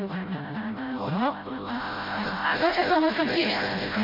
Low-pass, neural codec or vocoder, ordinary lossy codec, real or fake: 5.4 kHz; codec, 16 kHz, 0.5 kbps, FreqCodec, smaller model; MP3, 32 kbps; fake